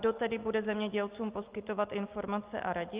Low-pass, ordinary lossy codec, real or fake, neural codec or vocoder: 3.6 kHz; Opus, 32 kbps; real; none